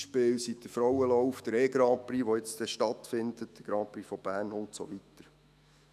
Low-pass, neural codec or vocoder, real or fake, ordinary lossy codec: 14.4 kHz; autoencoder, 48 kHz, 128 numbers a frame, DAC-VAE, trained on Japanese speech; fake; none